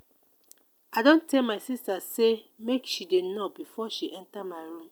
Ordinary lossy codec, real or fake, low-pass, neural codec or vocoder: none; real; none; none